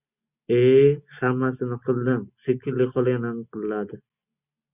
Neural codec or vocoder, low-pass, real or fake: none; 3.6 kHz; real